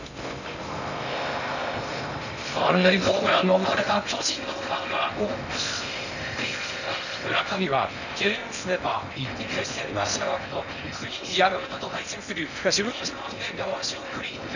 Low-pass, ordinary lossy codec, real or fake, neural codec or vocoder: 7.2 kHz; none; fake; codec, 16 kHz in and 24 kHz out, 0.6 kbps, FocalCodec, streaming, 2048 codes